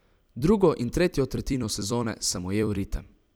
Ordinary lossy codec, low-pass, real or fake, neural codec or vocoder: none; none; fake; vocoder, 44.1 kHz, 128 mel bands every 256 samples, BigVGAN v2